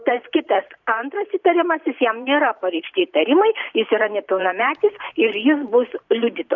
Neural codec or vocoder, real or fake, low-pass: vocoder, 44.1 kHz, 128 mel bands every 512 samples, BigVGAN v2; fake; 7.2 kHz